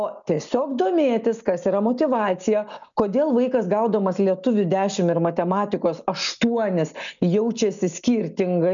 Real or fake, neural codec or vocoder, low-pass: real; none; 7.2 kHz